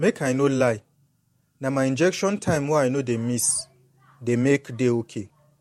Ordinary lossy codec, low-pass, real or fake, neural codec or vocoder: MP3, 64 kbps; 19.8 kHz; fake; vocoder, 48 kHz, 128 mel bands, Vocos